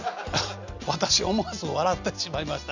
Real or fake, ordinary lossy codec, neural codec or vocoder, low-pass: real; none; none; 7.2 kHz